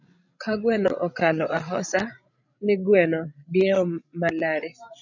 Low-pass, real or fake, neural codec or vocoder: 7.2 kHz; fake; codec, 16 kHz, 16 kbps, FreqCodec, larger model